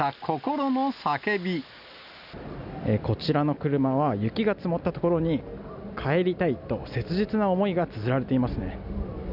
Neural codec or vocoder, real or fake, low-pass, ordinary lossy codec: none; real; 5.4 kHz; none